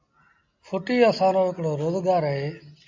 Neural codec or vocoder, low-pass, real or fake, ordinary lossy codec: none; 7.2 kHz; real; MP3, 64 kbps